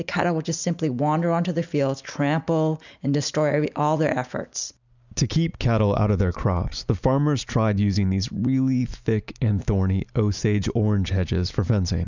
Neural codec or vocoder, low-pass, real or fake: none; 7.2 kHz; real